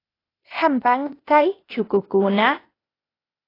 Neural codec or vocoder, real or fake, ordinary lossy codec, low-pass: codec, 16 kHz, 0.8 kbps, ZipCodec; fake; AAC, 24 kbps; 5.4 kHz